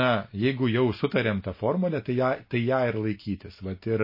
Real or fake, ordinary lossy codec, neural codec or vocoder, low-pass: real; MP3, 24 kbps; none; 5.4 kHz